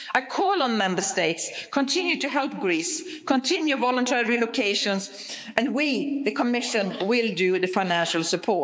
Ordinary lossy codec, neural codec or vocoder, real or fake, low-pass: none; codec, 16 kHz, 4 kbps, X-Codec, HuBERT features, trained on balanced general audio; fake; none